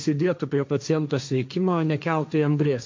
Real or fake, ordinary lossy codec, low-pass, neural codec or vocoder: fake; MP3, 64 kbps; 7.2 kHz; codec, 16 kHz, 1.1 kbps, Voila-Tokenizer